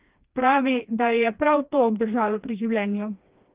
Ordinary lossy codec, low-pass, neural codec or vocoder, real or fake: Opus, 32 kbps; 3.6 kHz; codec, 16 kHz, 2 kbps, FreqCodec, smaller model; fake